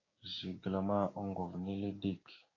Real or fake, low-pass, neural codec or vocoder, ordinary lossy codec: fake; 7.2 kHz; codec, 16 kHz, 6 kbps, DAC; AAC, 32 kbps